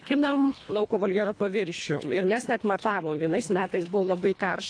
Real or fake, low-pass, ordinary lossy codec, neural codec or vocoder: fake; 9.9 kHz; AAC, 48 kbps; codec, 24 kHz, 1.5 kbps, HILCodec